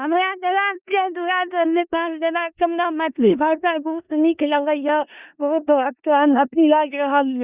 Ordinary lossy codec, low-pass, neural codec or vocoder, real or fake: Opus, 64 kbps; 3.6 kHz; codec, 16 kHz in and 24 kHz out, 0.4 kbps, LongCat-Audio-Codec, four codebook decoder; fake